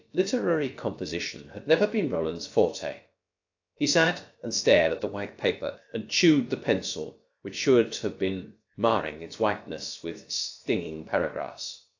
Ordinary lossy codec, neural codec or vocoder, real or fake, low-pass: AAC, 48 kbps; codec, 16 kHz, about 1 kbps, DyCAST, with the encoder's durations; fake; 7.2 kHz